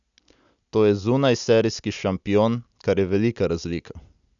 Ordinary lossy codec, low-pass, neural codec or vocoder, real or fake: none; 7.2 kHz; none; real